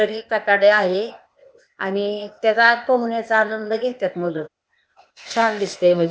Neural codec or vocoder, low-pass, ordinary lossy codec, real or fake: codec, 16 kHz, 0.8 kbps, ZipCodec; none; none; fake